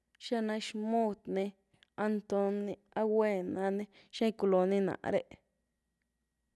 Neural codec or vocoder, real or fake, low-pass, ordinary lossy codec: none; real; none; none